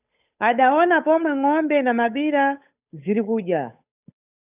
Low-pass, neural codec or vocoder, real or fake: 3.6 kHz; codec, 16 kHz, 8 kbps, FunCodec, trained on Chinese and English, 25 frames a second; fake